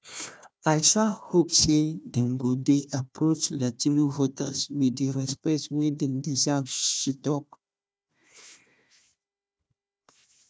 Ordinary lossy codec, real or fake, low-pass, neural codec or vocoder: none; fake; none; codec, 16 kHz, 1 kbps, FunCodec, trained on Chinese and English, 50 frames a second